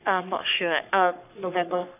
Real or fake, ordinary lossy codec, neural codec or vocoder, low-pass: fake; none; codec, 44.1 kHz, 3.4 kbps, Pupu-Codec; 3.6 kHz